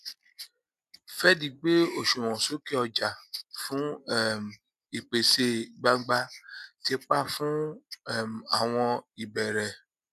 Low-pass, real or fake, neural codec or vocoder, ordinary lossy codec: 14.4 kHz; real; none; none